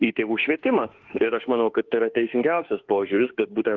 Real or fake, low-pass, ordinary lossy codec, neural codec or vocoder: fake; 7.2 kHz; Opus, 16 kbps; autoencoder, 48 kHz, 32 numbers a frame, DAC-VAE, trained on Japanese speech